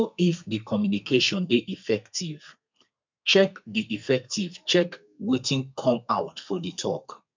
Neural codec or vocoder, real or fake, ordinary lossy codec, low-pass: codec, 32 kHz, 1.9 kbps, SNAC; fake; MP3, 64 kbps; 7.2 kHz